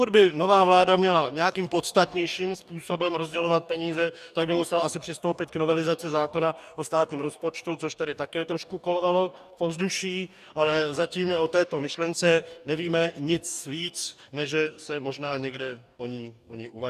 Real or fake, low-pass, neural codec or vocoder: fake; 14.4 kHz; codec, 44.1 kHz, 2.6 kbps, DAC